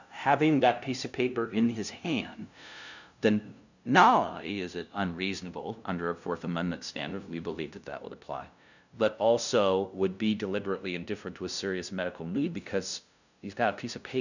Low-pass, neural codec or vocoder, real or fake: 7.2 kHz; codec, 16 kHz, 0.5 kbps, FunCodec, trained on LibriTTS, 25 frames a second; fake